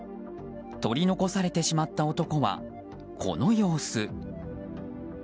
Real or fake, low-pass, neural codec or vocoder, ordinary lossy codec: real; none; none; none